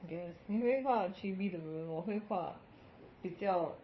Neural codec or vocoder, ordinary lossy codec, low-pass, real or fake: codec, 16 kHz, 4 kbps, FunCodec, trained on Chinese and English, 50 frames a second; MP3, 24 kbps; 7.2 kHz; fake